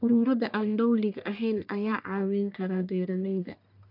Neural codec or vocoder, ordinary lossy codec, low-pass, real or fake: codec, 44.1 kHz, 1.7 kbps, Pupu-Codec; none; 5.4 kHz; fake